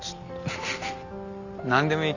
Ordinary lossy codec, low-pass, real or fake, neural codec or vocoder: none; 7.2 kHz; real; none